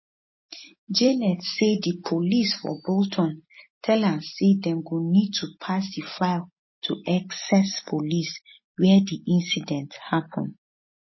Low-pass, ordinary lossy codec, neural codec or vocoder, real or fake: 7.2 kHz; MP3, 24 kbps; none; real